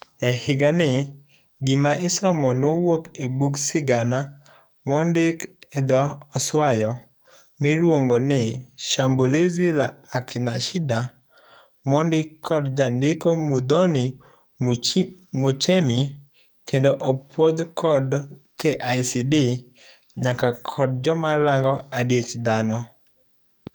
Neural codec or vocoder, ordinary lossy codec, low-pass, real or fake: codec, 44.1 kHz, 2.6 kbps, SNAC; none; none; fake